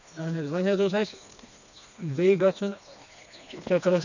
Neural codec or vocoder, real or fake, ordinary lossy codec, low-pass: codec, 16 kHz, 2 kbps, FreqCodec, smaller model; fake; none; 7.2 kHz